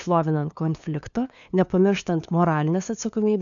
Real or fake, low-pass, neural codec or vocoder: fake; 7.2 kHz; codec, 16 kHz, 2 kbps, FunCodec, trained on LibriTTS, 25 frames a second